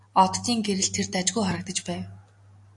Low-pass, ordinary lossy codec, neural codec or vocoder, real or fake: 10.8 kHz; AAC, 64 kbps; none; real